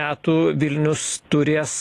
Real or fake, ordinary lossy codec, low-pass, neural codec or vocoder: real; AAC, 48 kbps; 14.4 kHz; none